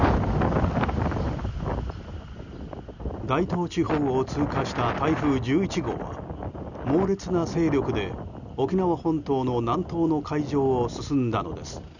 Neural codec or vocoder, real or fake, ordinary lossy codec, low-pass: none; real; none; 7.2 kHz